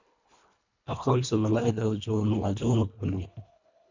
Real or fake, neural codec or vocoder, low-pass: fake; codec, 24 kHz, 1.5 kbps, HILCodec; 7.2 kHz